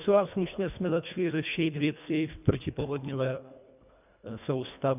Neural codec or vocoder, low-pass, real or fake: codec, 24 kHz, 1.5 kbps, HILCodec; 3.6 kHz; fake